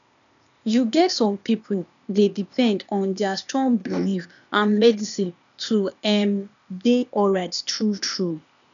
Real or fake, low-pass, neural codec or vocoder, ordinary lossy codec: fake; 7.2 kHz; codec, 16 kHz, 0.8 kbps, ZipCodec; none